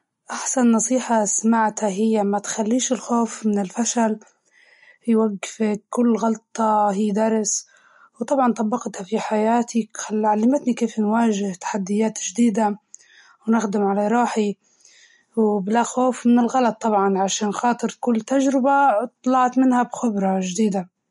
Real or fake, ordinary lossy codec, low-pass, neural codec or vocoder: real; MP3, 48 kbps; 19.8 kHz; none